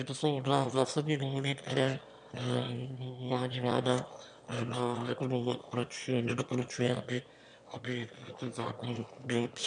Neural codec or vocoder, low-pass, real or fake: autoencoder, 22.05 kHz, a latent of 192 numbers a frame, VITS, trained on one speaker; 9.9 kHz; fake